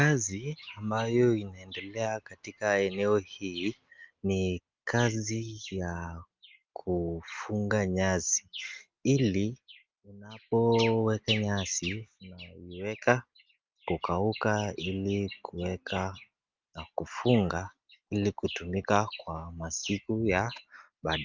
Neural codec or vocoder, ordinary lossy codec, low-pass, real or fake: none; Opus, 32 kbps; 7.2 kHz; real